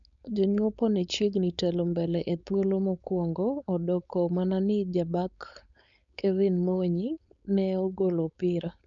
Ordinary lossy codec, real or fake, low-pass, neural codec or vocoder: none; fake; 7.2 kHz; codec, 16 kHz, 4.8 kbps, FACodec